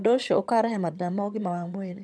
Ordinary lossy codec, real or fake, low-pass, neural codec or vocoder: none; fake; none; vocoder, 22.05 kHz, 80 mel bands, HiFi-GAN